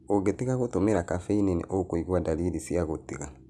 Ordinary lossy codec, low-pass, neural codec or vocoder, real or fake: none; none; none; real